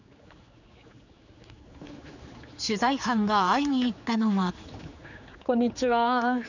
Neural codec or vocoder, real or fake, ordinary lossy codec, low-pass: codec, 16 kHz, 4 kbps, X-Codec, HuBERT features, trained on general audio; fake; none; 7.2 kHz